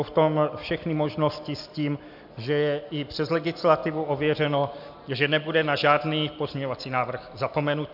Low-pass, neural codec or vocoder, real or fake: 5.4 kHz; none; real